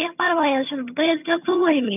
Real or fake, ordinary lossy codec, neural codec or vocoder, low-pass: fake; none; vocoder, 22.05 kHz, 80 mel bands, HiFi-GAN; 3.6 kHz